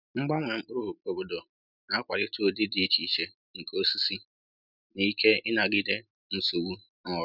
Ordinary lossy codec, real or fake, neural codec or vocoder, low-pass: none; real; none; 5.4 kHz